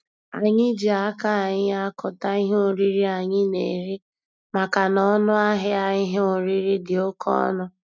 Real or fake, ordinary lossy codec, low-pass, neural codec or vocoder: real; none; none; none